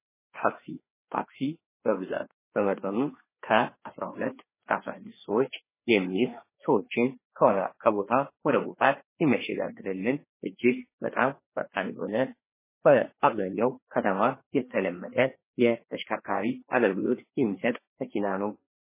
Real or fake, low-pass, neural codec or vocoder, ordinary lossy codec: fake; 3.6 kHz; codec, 16 kHz, 2 kbps, FreqCodec, larger model; MP3, 16 kbps